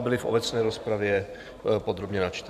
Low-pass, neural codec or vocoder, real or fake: 14.4 kHz; vocoder, 44.1 kHz, 128 mel bands every 512 samples, BigVGAN v2; fake